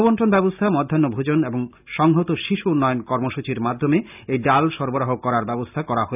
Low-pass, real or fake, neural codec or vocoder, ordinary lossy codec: 3.6 kHz; real; none; none